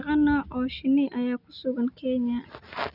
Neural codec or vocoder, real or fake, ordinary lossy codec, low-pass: none; real; none; 5.4 kHz